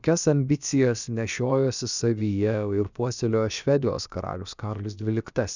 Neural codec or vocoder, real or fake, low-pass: codec, 16 kHz, about 1 kbps, DyCAST, with the encoder's durations; fake; 7.2 kHz